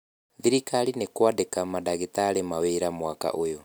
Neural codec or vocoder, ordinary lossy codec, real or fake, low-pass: none; none; real; none